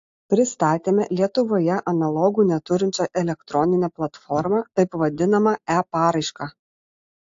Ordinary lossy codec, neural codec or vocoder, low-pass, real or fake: AAC, 48 kbps; none; 7.2 kHz; real